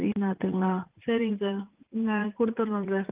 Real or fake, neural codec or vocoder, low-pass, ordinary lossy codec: fake; vocoder, 44.1 kHz, 80 mel bands, Vocos; 3.6 kHz; Opus, 32 kbps